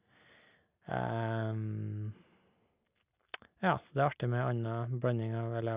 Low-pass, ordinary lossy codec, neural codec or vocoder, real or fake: 3.6 kHz; Opus, 64 kbps; none; real